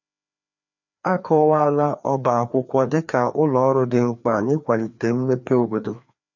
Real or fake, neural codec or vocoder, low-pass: fake; codec, 16 kHz, 2 kbps, FreqCodec, larger model; 7.2 kHz